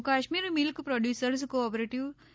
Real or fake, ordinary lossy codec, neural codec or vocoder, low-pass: real; none; none; none